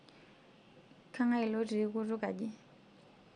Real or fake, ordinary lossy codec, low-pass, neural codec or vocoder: real; none; 9.9 kHz; none